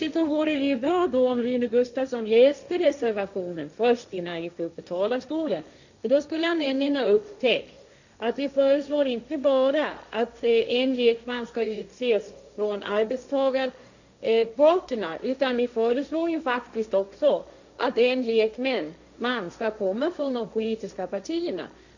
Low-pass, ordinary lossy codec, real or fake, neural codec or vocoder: 7.2 kHz; none; fake; codec, 16 kHz, 1.1 kbps, Voila-Tokenizer